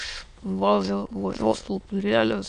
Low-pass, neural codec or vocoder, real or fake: 9.9 kHz; autoencoder, 22.05 kHz, a latent of 192 numbers a frame, VITS, trained on many speakers; fake